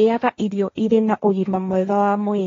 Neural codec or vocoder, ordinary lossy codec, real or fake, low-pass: codec, 16 kHz, 1.1 kbps, Voila-Tokenizer; AAC, 32 kbps; fake; 7.2 kHz